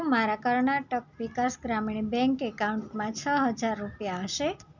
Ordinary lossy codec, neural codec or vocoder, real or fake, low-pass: none; none; real; 7.2 kHz